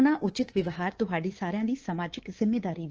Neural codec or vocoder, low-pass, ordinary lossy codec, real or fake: codec, 24 kHz, 3.1 kbps, DualCodec; 7.2 kHz; Opus, 16 kbps; fake